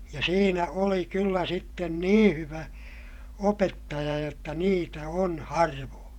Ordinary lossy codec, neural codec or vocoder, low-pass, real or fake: none; vocoder, 48 kHz, 128 mel bands, Vocos; 19.8 kHz; fake